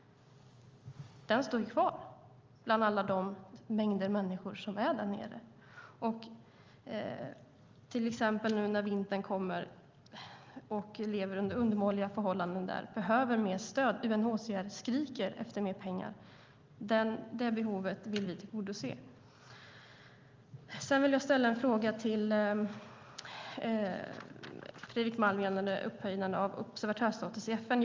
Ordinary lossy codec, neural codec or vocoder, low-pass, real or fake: Opus, 32 kbps; none; 7.2 kHz; real